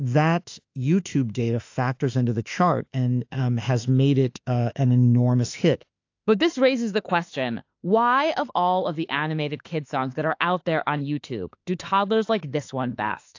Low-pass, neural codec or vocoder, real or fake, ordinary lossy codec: 7.2 kHz; autoencoder, 48 kHz, 32 numbers a frame, DAC-VAE, trained on Japanese speech; fake; AAC, 48 kbps